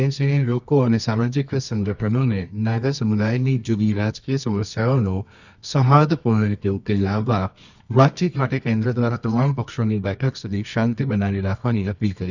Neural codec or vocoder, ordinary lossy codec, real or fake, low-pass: codec, 24 kHz, 0.9 kbps, WavTokenizer, medium music audio release; none; fake; 7.2 kHz